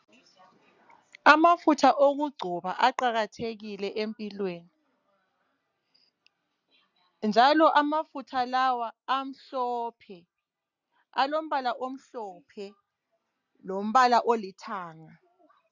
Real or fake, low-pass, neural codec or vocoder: real; 7.2 kHz; none